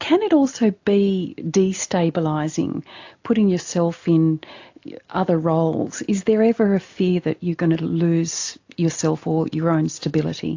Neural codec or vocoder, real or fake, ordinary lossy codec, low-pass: none; real; AAC, 48 kbps; 7.2 kHz